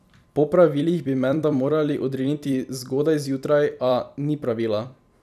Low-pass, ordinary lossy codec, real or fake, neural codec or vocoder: 14.4 kHz; none; fake; vocoder, 44.1 kHz, 128 mel bands every 256 samples, BigVGAN v2